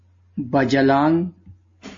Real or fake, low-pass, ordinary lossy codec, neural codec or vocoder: real; 7.2 kHz; MP3, 32 kbps; none